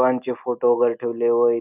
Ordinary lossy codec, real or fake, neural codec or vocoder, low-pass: none; real; none; 3.6 kHz